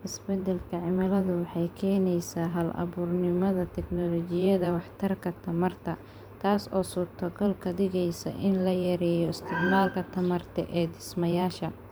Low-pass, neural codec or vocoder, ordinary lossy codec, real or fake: none; vocoder, 44.1 kHz, 128 mel bands every 512 samples, BigVGAN v2; none; fake